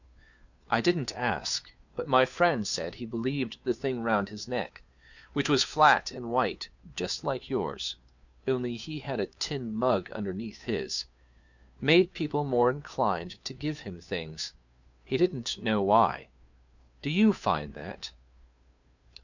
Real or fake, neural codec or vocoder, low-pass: fake; codec, 16 kHz, 2 kbps, FunCodec, trained on Chinese and English, 25 frames a second; 7.2 kHz